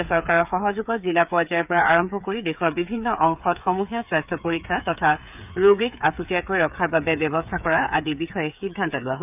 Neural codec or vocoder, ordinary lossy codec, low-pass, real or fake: codec, 16 kHz, 8 kbps, FreqCodec, smaller model; none; 3.6 kHz; fake